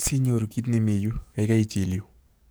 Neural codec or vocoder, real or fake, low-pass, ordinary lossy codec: codec, 44.1 kHz, 7.8 kbps, DAC; fake; none; none